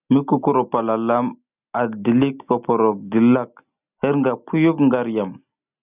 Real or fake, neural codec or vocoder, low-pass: real; none; 3.6 kHz